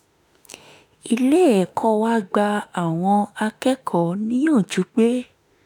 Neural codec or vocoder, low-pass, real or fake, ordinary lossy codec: autoencoder, 48 kHz, 32 numbers a frame, DAC-VAE, trained on Japanese speech; none; fake; none